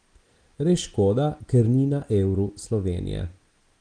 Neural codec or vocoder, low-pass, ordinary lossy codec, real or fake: none; 9.9 kHz; Opus, 24 kbps; real